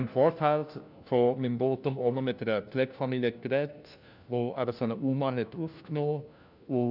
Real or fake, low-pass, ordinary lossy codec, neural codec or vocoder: fake; 5.4 kHz; none; codec, 16 kHz, 1 kbps, FunCodec, trained on LibriTTS, 50 frames a second